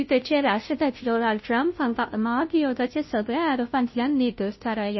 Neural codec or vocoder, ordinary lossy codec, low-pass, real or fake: codec, 16 kHz, 0.5 kbps, FunCodec, trained on Chinese and English, 25 frames a second; MP3, 24 kbps; 7.2 kHz; fake